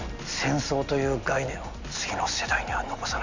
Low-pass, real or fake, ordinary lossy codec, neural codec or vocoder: 7.2 kHz; real; Opus, 64 kbps; none